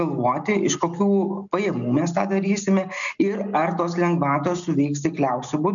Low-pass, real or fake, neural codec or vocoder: 7.2 kHz; real; none